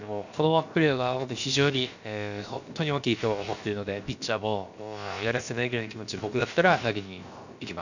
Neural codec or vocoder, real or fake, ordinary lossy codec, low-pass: codec, 16 kHz, about 1 kbps, DyCAST, with the encoder's durations; fake; none; 7.2 kHz